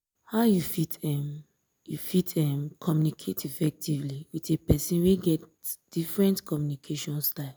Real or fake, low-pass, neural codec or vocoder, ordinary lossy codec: real; none; none; none